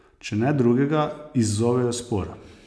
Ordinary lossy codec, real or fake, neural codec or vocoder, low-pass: none; real; none; none